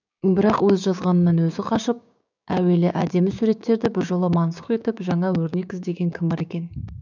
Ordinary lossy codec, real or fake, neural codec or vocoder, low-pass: none; fake; codec, 16 kHz, 6 kbps, DAC; 7.2 kHz